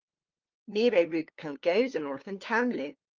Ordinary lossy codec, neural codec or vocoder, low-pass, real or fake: Opus, 32 kbps; codec, 16 kHz, 2 kbps, FunCodec, trained on LibriTTS, 25 frames a second; 7.2 kHz; fake